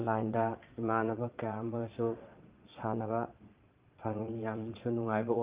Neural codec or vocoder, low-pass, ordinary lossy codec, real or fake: vocoder, 22.05 kHz, 80 mel bands, Vocos; 3.6 kHz; Opus, 32 kbps; fake